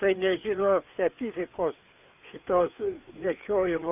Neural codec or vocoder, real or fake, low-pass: codec, 16 kHz, 4 kbps, FreqCodec, larger model; fake; 3.6 kHz